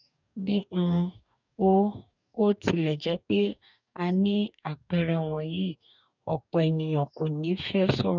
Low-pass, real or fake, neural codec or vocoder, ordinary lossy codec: 7.2 kHz; fake; codec, 44.1 kHz, 2.6 kbps, DAC; none